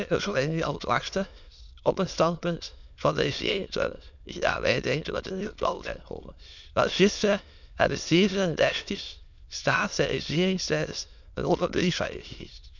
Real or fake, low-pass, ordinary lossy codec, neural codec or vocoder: fake; 7.2 kHz; none; autoencoder, 22.05 kHz, a latent of 192 numbers a frame, VITS, trained on many speakers